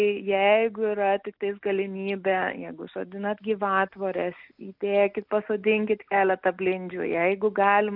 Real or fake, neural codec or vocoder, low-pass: real; none; 5.4 kHz